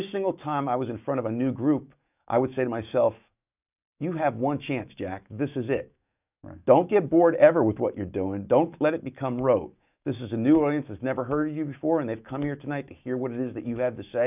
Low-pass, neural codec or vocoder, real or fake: 3.6 kHz; autoencoder, 48 kHz, 128 numbers a frame, DAC-VAE, trained on Japanese speech; fake